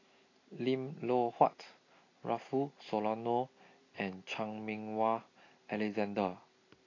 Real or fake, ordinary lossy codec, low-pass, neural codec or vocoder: real; AAC, 32 kbps; 7.2 kHz; none